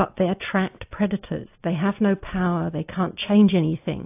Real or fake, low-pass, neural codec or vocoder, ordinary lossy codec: real; 3.6 kHz; none; AAC, 32 kbps